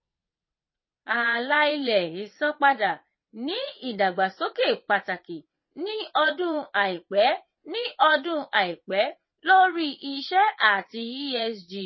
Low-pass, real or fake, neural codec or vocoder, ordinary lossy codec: 7.2 kHz; fake; vocoder, 22.05 kHz, 80 mel bands, WaveNeXt; MP3, 24 kbps